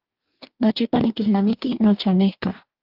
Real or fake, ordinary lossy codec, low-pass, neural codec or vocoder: fake; Opus, 24 kbps; 5.4 kHz; codec, 44.1 kHz, 2.6 kbps, DAC